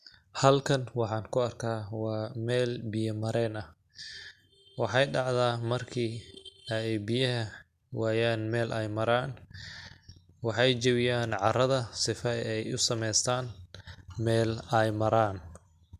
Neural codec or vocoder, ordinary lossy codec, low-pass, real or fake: none; MP3, 96 kbps; 14.4 kHz; real